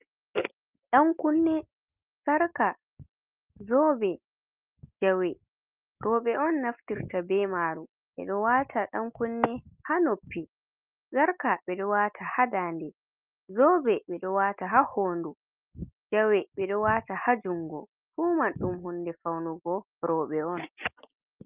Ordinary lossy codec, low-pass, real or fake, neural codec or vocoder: Opus, 24 kbps; 3.6 kHz; real; none